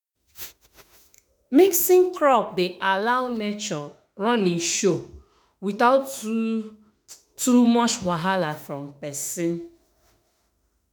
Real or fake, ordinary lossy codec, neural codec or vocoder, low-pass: fake; none; autoencoder, 48 kHz, 32 numbers a frame, DAC-VAE, trained on Japanese speech; none